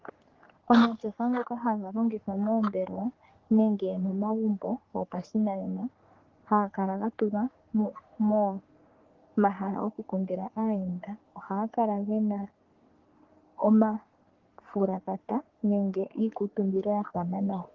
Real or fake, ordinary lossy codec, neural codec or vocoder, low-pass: fake; Opus, 16 kbps; codec, 44.1 kHz, 3.4 kbps, Pupu-Codec; 7.2 kHz